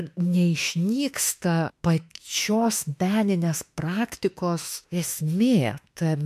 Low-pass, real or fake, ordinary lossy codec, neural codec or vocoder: 14.4 kHz; fake; MP3, 96 kbps; autoencoder, 48 kHz, 32 numbers a frame, DAC-VAE, trained on Japanese speech